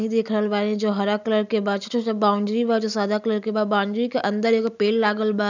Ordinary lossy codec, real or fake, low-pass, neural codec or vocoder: none; real; 7.2 kHz; none